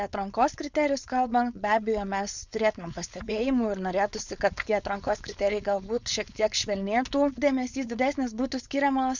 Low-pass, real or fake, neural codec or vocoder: 7.2 kHz; fake; codec, 16 kHz, 4.8 kbps, FACodec